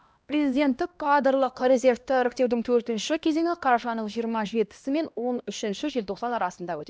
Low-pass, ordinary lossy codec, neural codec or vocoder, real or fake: none; none; codec, 16 kHz, 1 kbps, X-Codec, HuBERT features, trained on LibriSpeech; fake